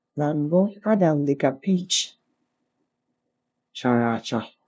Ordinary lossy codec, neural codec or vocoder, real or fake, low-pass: none; codec, 16 kHz, 0.5 kbps, FunCodec, trained on LibriTTS, 25 frames a second; fake; none